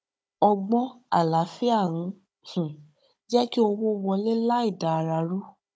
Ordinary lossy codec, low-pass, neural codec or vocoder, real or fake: none; none; codec, 16 kHz, 16 kbps, FunCodec, trained on Chinese and English, 50 frames a second; fake